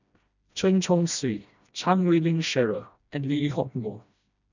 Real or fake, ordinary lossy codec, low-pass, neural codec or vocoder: fake; none; 7.2 kHz; codec, 16 kHz, 1 kbps, FreqCodec, smaller model